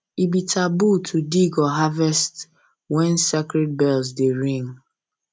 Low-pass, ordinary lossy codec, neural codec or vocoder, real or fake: none; none; none; real